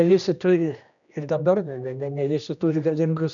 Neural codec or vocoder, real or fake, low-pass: codec, 16 kHz, 1 kbps, X-Codec, HuBERT features, trained on general audio; fake; 7.2 kHz